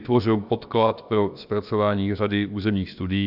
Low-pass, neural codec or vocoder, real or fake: 5.4 kHz; codec, 16 kHz, about 1 kbps, DyCAST, with the encoder's durations; fake